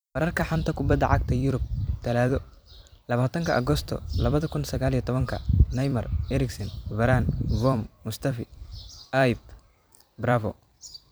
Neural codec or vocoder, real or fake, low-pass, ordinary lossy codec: vocoder, 44.1 kHz, 128 mel bands every 256 samples, BigVGAN v2; fake; none; none